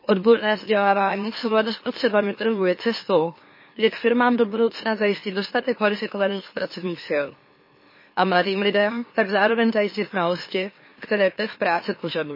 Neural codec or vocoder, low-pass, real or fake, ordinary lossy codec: autoencoder, 44.1 kHz, a latent of 192 numbers a frame, MeloTTS; 5.4 kHz; fake; MP3, 24 kbps